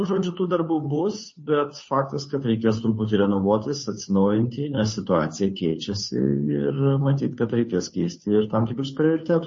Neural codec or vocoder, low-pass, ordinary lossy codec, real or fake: codec, 16 kHz, 2 kbps, FunCodec, trained on Chinese and English, 25 frames a second; 7.2 kHz; MP3, 32 kbps; fake